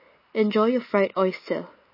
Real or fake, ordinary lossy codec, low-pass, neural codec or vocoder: real; MP3, 24 kbps; 5.4 kHz; none